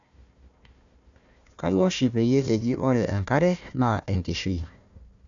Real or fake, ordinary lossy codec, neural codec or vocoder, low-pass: fake; none; codec, 16 kHz, 1 kbps, FunCodec, trained on Chinese and English, 50 frames a second; 7.2 kHz